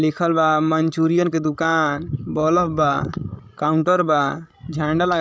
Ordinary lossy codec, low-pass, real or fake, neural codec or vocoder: none; none; fake; codec, 16 kHz, 16 kbps, FreqCodec, larger model